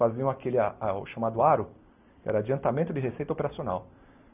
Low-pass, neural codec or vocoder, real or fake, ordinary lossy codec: 3.6 kHz; none; real; none